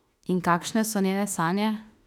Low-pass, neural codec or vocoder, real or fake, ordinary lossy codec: 19.8 kHz; autoencoder, 48 kHz, 32 numbers a frame, DAC-VAE, trained on Japanese speech; fake; none